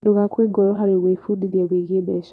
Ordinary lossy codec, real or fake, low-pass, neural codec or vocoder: none; fake; none; vocoder, 22.05 kHz, 80 mel bands, Vocos